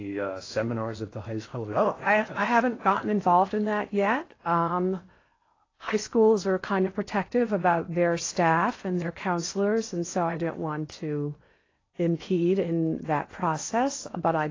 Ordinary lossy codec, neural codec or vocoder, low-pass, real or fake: AAC, 32 kbps; codec, 16 kHz in and 24 kHz out, 0.8 kbps, FocalCodec, streaming, 65536 codes; 7.2 kHz; fake